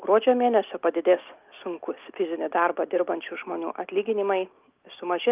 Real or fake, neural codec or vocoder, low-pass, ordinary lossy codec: real; none; 3.6 kHz; Opus, 24 kbps